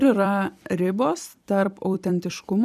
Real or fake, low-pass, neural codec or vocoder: fake; 14.4 kHz; vocoder, 44.1 kHz, 128 mel bands every 256 samples, BigVGAN v2